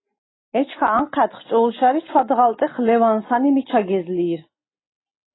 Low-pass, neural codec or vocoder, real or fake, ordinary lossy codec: 7.2 kHz; none; real; AAC, 16 kbps